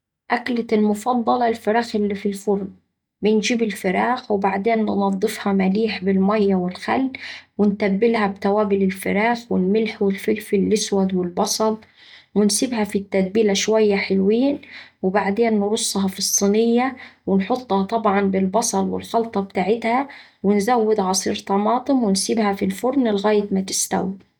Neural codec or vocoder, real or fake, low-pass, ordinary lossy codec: none; real; 19.8 kHz; none